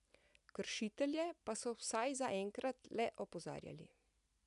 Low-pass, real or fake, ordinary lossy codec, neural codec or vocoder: 10.8 kHz; real; none; none